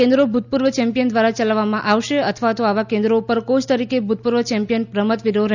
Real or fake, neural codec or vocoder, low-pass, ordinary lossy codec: real; none; 7.2 kHz; none